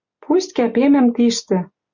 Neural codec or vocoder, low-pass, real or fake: none; 7.2 kHz; real